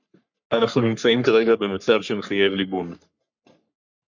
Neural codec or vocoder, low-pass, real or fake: codec, 44.1 kHz, 3.4 kbps, Pupu-Codec; 7.2 kHz; fake